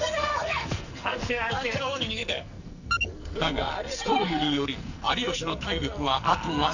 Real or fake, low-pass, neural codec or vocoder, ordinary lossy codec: fake; 7.2 kHz; codec, 44.1 kHz, 2.6 kbps, SNAC; none